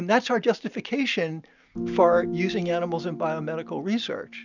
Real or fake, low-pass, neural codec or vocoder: real; 7.2 kHz; none